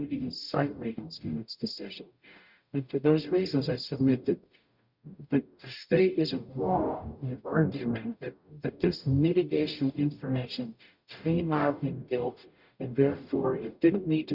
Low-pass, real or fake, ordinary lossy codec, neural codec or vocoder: 5.4 kHz; fake; Opus, 64 kbps; codec, 44.1 kHz, 0.9 kbps, DAC